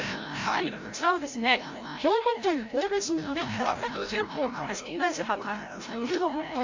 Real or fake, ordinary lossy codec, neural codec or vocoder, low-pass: fake; MP3, 48 kbps; codec, 16 kHz, 0.5 kbps, FreqCodec, larger model; 7.2 kHz